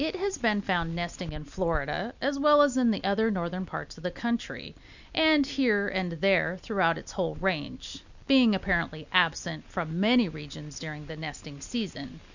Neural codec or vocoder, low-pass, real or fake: none; 7.2 kHz; real